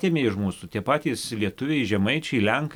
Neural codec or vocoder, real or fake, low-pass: none; real; 19.8 kHz